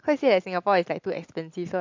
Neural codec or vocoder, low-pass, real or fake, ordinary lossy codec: none; 7.2 kHz; real; MP3, 48 kbps